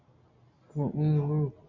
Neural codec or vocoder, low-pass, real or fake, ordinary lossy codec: none; 7.2 kHz; real; MP3, 48 kbps